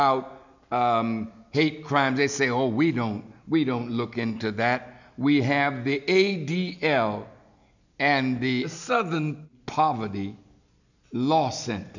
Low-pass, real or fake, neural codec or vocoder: 7.2 kHz; real; none